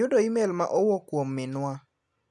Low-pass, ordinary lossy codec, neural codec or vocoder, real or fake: none; none; none; real